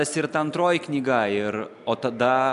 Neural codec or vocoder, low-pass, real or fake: none; 10.8 kHz; real